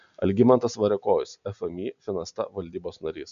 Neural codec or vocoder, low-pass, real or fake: none; 7.2 kHz; real